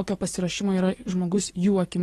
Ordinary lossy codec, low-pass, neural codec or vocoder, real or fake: AAC, 32 kbps; 19.8 kHz; codec, 44.1 kHz, 7.8 kbps, DAC; fake